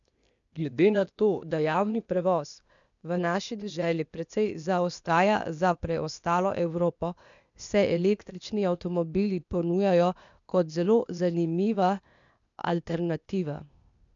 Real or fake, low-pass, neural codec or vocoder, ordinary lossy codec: fake; 7.2 kHz; codec, 16 kHz, 0.8 kbps, ZipCodec; none